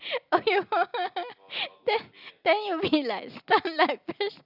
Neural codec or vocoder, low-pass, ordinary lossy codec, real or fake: none; 5.4 kHz; none; real